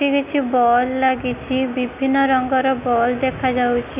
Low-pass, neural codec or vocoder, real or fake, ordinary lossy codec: 3.6 kHz; none; real; none